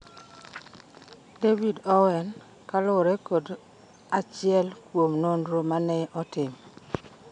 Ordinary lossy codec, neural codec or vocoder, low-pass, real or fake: none; none; 9.9 kHz; real